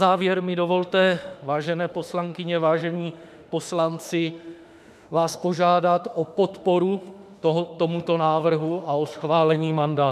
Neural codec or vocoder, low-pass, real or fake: autoencoder, 48 kHz, 32 numbers a frame, DAC-VAE, trained on Japanese speech; 14.4 kHz; fake